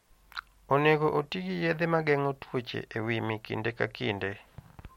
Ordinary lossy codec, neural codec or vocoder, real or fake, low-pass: MP3, 64 kbps; none; real; 19.8 kHz